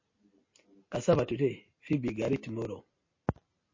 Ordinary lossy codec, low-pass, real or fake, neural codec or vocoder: MP3, 32 kbps; 7.2 kHz; real; none